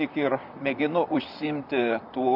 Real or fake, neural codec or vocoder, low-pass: fake; vocoder, 44.1 kHz, 128 mel bands, Pupu-Vocoder; 5.4 kHz